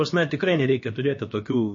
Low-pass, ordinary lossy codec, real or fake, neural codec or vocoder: 7.2 kHz; MP3, 32 kbps; fake; codec, 16 kHz, about 1 kbps, DyCAST, with the encoder's durations